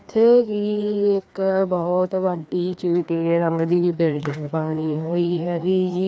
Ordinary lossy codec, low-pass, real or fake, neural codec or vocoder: none; none; fake; codec, 16 kHz, 2 kbps, FreqCodec, larger model